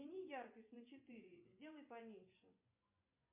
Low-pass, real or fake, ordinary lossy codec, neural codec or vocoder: 3.6 kHz; fake; MP3, 32 kbps; vocoder, 24 kHz, 100 mel bands, Vocos